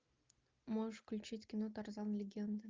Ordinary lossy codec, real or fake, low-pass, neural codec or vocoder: Opus, 24 kbps; real; 7.2 kHz; none